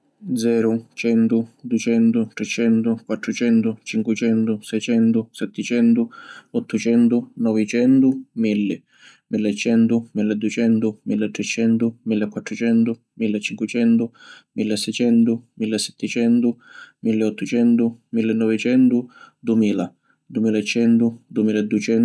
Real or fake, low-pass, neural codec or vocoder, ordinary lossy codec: real; none; none; none